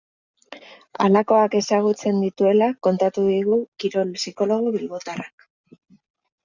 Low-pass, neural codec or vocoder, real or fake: 7.2 kHz; none; real